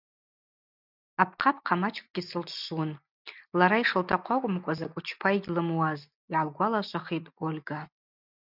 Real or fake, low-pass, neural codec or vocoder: real; 5.4 kHz; none